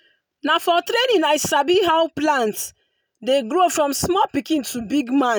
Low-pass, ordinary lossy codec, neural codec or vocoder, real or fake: none; none; none; real